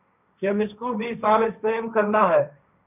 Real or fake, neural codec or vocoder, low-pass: fake; codec, 16 kHz, 1.1 kbps, Voila-Tokenizer; 3.6 kHz